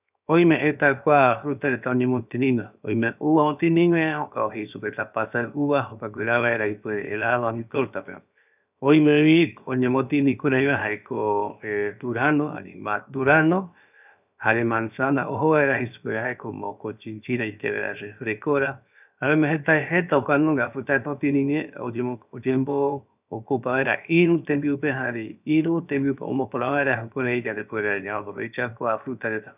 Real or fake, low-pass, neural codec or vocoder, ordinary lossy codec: fake; 3.6 kHz; codec, 16 kHz, 0.7 kbps, FocalCodec; none